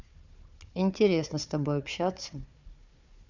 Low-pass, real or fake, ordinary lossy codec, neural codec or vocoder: 7.2 kHz; fake; none; codec, 16 kHz, 4 kbps, FunCodec, trained on Chinese and English, 50 frames a second